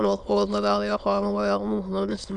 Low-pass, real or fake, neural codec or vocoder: 9.9 kHz; fake; autoencoder, 22.05 kHz, a latent of 192 numbers a frame, VITS, trained on many speakers